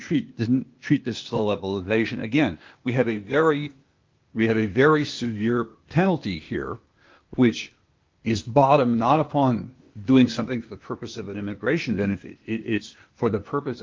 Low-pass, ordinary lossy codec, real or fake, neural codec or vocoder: 7.2 kHz; Opus, 32 kbps; fake; codec, 16 kHz, 0.8 kbps, ZipCodec